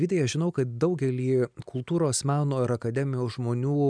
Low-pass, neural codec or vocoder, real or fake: 9.9 kHz; none; real